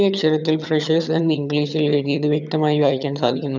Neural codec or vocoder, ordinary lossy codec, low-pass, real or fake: vocoder, 22.05 kHz, 80 mel bands, HiFi-GAN; none; 7.2 kHz; fake